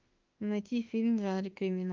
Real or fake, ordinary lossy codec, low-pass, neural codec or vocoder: fake; Opus, 24 kbps; 7.2 kHz; autoencoder, 48 kHz, 32 numbers a frame, DAC-VAE, trained on Japanese speech